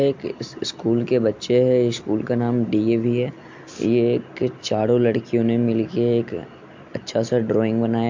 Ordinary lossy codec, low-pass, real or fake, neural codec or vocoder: MP3, 48 kbps; 7.2 kHz; real; none